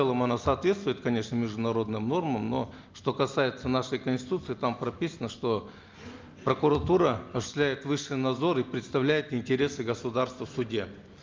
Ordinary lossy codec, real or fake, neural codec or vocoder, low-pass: Opus, 24 kbps; real; none; 7.2 kHz